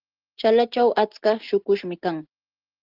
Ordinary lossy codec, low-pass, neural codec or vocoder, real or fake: Opus, 16 kbps; 5.4 kHz; none; real